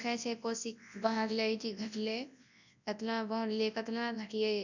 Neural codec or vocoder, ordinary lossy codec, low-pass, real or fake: codec, 24 kHz, 0.9 kbps, WavTokenizer, large speech release; none; 7.2 kHz; fake